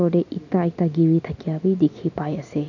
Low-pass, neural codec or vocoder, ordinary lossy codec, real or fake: 7.2 kHz; none; none; real